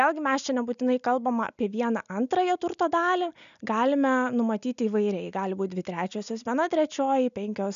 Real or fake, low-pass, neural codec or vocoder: real; 7.2 kHz; none